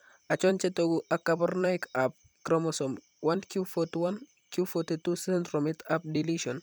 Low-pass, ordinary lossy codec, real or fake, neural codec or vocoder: none; none; fake; vocoder, 44.1 kHz, 128 mel bands every 256 samples, BigVGAN v2